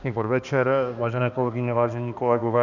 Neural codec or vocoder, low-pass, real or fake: codec, 16 kHz, 2 kbps, X-Codec, HuBERT features, trained on balanced general audio; 7.2 kHz; fake